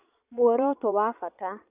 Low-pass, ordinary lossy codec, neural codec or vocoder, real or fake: 3.6 kHz; none; vocoder, 22.05 kHz, 80 mel bands, Vocos; fake